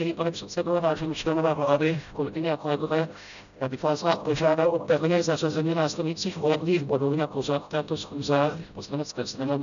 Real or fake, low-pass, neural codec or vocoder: fake; 7.2 kHz; codec, 16 kHz, 0.5 kbps, FreqCodec, smaller model